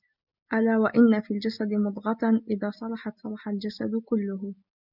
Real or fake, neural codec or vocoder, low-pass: real; none; 5.4 kHz